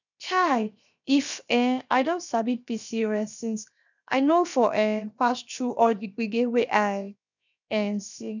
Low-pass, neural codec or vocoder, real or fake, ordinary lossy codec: 7.2 kHz; codec, 16 kHz, 0.7 kbps, FocalCodec; fake; none